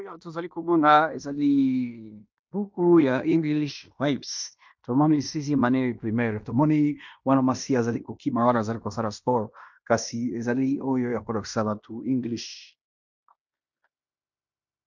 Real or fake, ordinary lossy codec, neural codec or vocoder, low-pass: fake; MP3, 64 kbps; codec, 16 kHz in and 24 kHz out, 0.9 kbps, LongCat-Audio-Codec, fine tuned four codebook decoder; 7.2 kHz